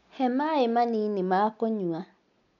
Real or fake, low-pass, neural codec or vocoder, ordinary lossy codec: real; 7.2 kHz; none; none